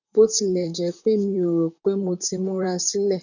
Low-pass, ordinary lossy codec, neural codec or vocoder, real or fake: 7.2 kHz; none; vocoder, 44.1 kHz, 128 mel bands, Pupu-Vocoder; fake